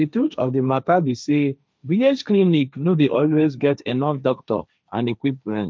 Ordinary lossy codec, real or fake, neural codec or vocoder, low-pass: none; fake; codec, 16 kHz, 1.1 kbps, Voila-Tokenizer; none